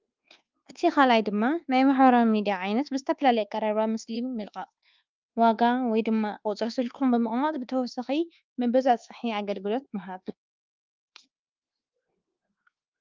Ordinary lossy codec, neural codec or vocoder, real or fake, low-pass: Opus, 32 kbps; codec, 24 kHz, 1.2 kbps, DualCodec; fake; 7.2 kHz